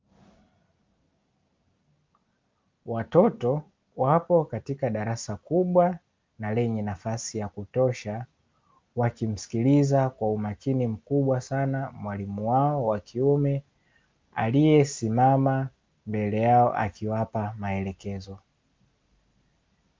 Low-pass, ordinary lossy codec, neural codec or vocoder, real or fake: 7.2 kHz; Opus, 24 kbps; none; real